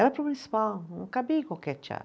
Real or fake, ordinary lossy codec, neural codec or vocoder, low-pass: real; none; none; none